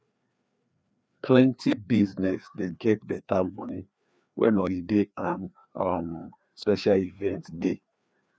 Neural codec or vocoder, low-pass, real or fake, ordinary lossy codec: codec, 16 kHz, 2 kbps, FreqCodec, larger model; none; fake; none